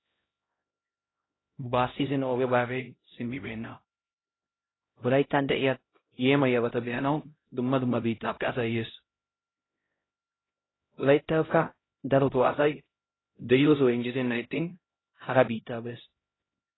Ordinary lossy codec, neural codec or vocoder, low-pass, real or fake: AAC, 16 kbps; codec, 16 kHz, 0.5 kbps, X-Codec, HuBERT features, trained on LibriSpeech; 7.2 kHz; fake